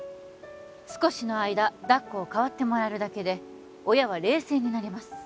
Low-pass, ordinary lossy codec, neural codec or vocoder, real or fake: none; none; none; real